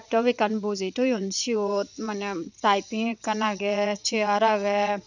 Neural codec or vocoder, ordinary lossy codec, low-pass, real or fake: vocoder, 22.05 kHz, 80 mel bands, WaveNeXt; none; 7.2 kHz; fake